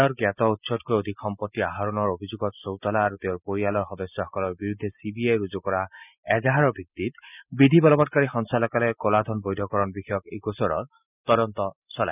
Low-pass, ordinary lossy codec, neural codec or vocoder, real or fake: 3.6 kHz; none; none; real